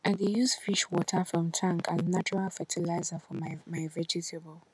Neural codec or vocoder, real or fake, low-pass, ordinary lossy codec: none; real; none; none